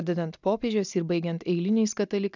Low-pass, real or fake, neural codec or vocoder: 7.2 kHz; real; none